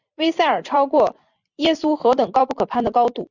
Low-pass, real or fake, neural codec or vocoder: 7.2 kHz; fake; vocoder, 44.1 kHz, 128 mel bands every 256 samples, BigVGAN v2